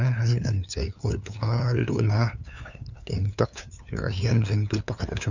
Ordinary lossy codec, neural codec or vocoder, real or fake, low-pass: none; codec, 16 kHz, 2 kbps, FunCodec, trained on LibriTTS, 25 frames a second; fake; 7.2 kHz